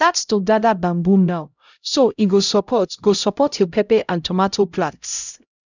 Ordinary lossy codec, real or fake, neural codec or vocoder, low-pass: none; fake; codec, 16 kHz, 0.5 kbps, X-Codec, HuBERT features, trained on LibriSpeech; 7.2 kHz